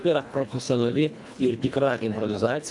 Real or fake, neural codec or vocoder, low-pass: fake; codec, 24 kHz, 1.5 kbps, HILCodec; 10.8 kHz